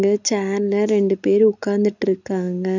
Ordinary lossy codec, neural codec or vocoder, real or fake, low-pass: none; none; real; 7.2 kHz